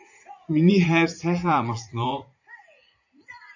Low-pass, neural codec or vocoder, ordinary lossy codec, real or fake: 7.2 kHz; vocoder, 44.1 kHz, 80 mel bands, Vocos; AAC, 48 kbps; fake